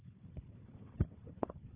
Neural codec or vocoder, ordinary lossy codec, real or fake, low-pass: codec, 16 kHz, 4 kbps, FunCodec, trained on Chinese and English, 50 frames a second; Opus, 24 kbps; fake; 3.6 kHz